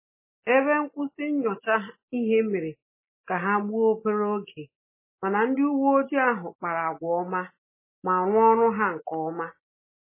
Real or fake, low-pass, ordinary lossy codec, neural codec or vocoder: real; 3.6 kHz; MP3, 16 kbps; none